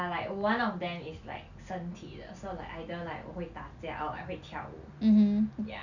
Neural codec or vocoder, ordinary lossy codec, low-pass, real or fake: none; none; 7.2 kHz; real